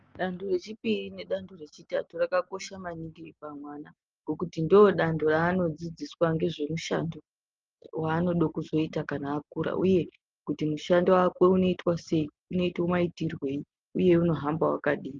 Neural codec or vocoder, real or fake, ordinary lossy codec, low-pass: none; real; Opus, 24 kbps; 7.2 kHz